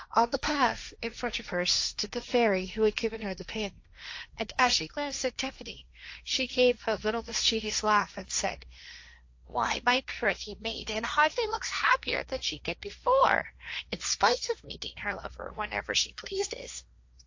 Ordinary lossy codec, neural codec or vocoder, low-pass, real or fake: AAC, 48 kbps; codec, 16 kHz, 1.1 kbps, Voila-Tokenizer; 7.2 kHz; fake